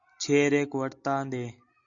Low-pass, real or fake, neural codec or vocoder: 7.2 kHz; real; none